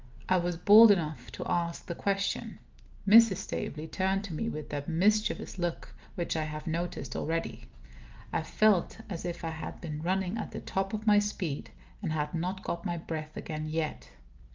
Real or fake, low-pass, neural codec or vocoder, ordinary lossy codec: real; 7.2 kHz; none; Opus, 32 kbps